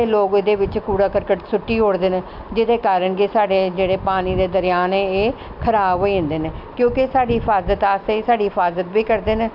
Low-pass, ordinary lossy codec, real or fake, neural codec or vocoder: 5.4 kHz; none; real; none